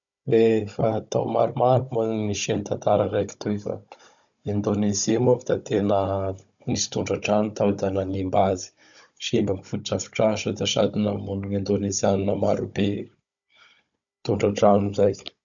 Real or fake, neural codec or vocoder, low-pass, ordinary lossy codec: fake; codec, 16 kHz, 16 kbps, FunCodec, trained on Chinese and English, 50 frames a second; 7.2 kHz; none